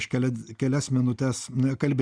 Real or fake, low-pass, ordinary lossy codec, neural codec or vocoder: real; 9.9 kHz; Opus, 64 kbps; none